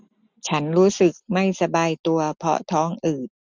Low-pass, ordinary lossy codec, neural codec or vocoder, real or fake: none; none; none; real